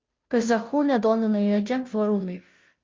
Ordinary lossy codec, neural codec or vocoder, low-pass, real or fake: Opus, 24 kbps; codec, 16 kHz, 0.5 kbps, FunCodec, trained on Chinese and English, 25 frames a second; 7.2 kHz; fake